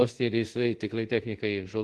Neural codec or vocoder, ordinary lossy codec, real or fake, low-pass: codec, 24 kHz, 0.5 kbps, DualCodec; Opus, 16 kbps; fake; 10.8 kHz